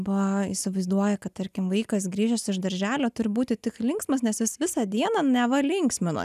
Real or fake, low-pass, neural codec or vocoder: real; 14.4 kHz; none